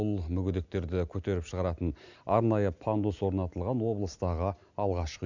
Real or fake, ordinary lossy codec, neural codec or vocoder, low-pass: real; none; none; 7.2 kHz